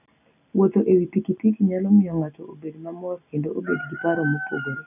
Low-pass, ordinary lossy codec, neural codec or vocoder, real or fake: 3.6 kHz; none; none; real